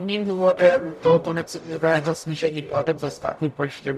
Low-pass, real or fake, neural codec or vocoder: 14.4 kHz; fake; codec, 44.1 kHz, 0.9 kbps, DAC